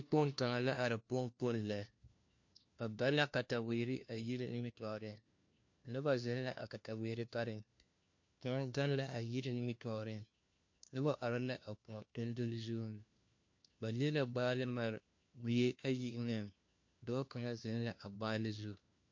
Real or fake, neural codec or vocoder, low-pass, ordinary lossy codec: fake; codec, 16 kHz, 1 kbps, FunCodec, trained on LibriTTS, 50 frames a second; 7.2 kHz; MP3, 48 kbps